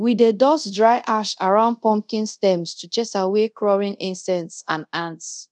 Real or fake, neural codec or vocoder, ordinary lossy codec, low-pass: fake; codec, 24 kHz, 0.5 kbps, DualCodec; none; 10.8 kHz